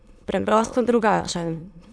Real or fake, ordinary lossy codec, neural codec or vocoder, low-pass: fake; none; autoencoder, 22.05 kHz, a latent of 192 numbers a frame, VITS, trained on many speakers; none